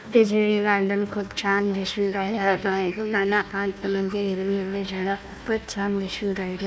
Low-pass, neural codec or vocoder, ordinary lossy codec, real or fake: none; codec, 16 kHz, 1 kbps, FunCodec, trained on Chinese and English, 50 frames a second; none; fake